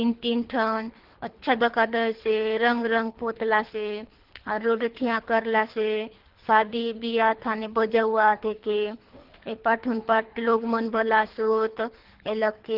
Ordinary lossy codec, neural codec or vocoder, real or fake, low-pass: Opus, 16 kbps; codec, 24 kHz, 3 kbps, HILCodec; fake; 5.4 kHz